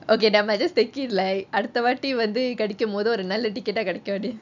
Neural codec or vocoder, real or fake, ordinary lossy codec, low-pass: none; real; none; 7.2 kHz